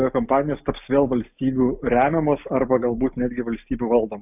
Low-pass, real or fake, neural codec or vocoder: 3.6 kHz; real; none